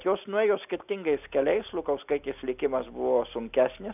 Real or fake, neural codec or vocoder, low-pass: real; none; 3.6 kHz